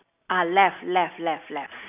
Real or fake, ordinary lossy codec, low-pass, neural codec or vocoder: real; none; 3.6 kHz; none